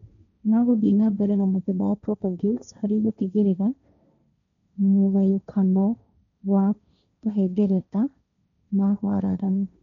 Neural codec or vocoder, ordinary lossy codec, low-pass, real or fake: codec, 16 kHz, 1.1 kbps, Voila-Tokenizer; none; 7.2 kHz; fake